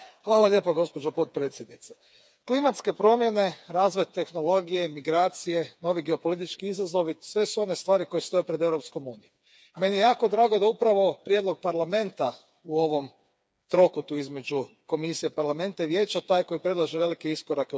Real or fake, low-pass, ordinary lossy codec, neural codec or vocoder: fake; none; none; codec, 16 kHz, 4 kbps, FreqCodec, smaller model